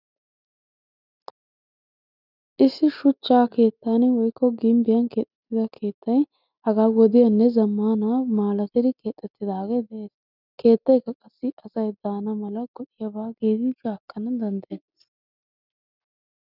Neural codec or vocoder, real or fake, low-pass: none; real; 5.4 kHz